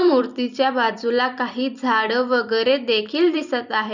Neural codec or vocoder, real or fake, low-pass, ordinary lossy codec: vocoder, 44.1 kHz, 128 mel bands every 256 samples, BigVGAN v2; fake; 7.2 kHz; none